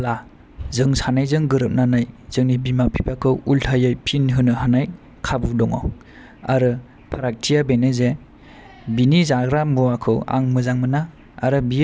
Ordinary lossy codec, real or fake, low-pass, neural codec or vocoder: none; real; none; none